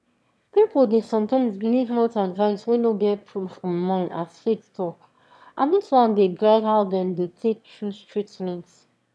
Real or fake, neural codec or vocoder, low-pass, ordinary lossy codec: fake; autoencoder, 22.05 kHz, a latent of 192 numbers a frame, VITS, trained on one speaker; none; none